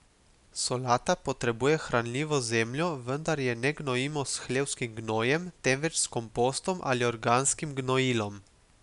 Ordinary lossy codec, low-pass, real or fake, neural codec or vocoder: none; 10.8 kHz; real; none